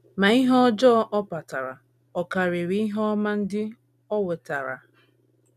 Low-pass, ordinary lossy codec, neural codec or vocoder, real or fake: 14.4 kHz; none; none; real